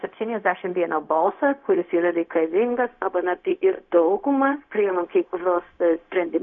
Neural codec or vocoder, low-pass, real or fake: codec, 16 kHz, 0.4 kbps, LongCat-Audio-Codec; 7.2 kHz; fake